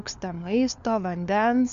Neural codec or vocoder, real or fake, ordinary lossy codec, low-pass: codec, 16 kHz, 4 kbps, FunCodec, trained on LibriTTS, 50 frames a second; fake; AAC, 96 kbps; 7.2 kHz